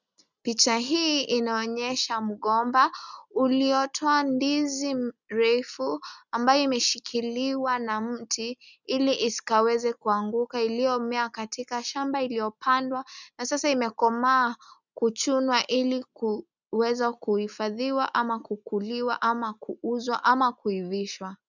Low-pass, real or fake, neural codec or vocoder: 7.2 kHz; real; none